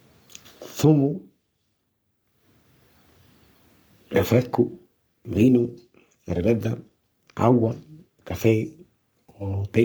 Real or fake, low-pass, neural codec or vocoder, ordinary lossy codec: fake; none; codec, 44.1 kHz, 3.4 kbps, Pupu-Codec; none